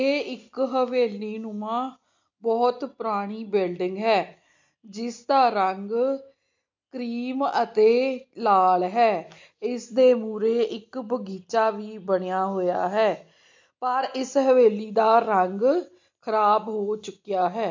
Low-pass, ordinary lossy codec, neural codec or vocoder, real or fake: 7.2 kHz; MP3, 48 kbps; none; real